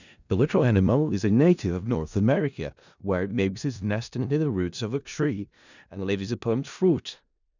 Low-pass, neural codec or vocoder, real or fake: 7.2 kHz; codec, 16 kHz in and 24 kHz out, 0.4 kbps, LongCat-Audio-Codec, four codebook decoder; fake